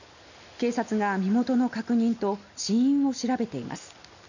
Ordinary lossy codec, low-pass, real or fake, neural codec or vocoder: none; 7.2 kHz; real; none